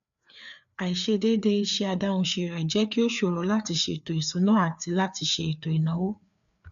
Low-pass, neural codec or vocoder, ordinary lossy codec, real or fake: 7.2 kHz; codec, 16 kHz, 4 kbps, FreqCodec, larger model; none; fake